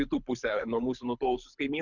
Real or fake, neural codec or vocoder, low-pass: fake; codec, 24 kHz, 6 kbps, HILCodec; 7.2 kHz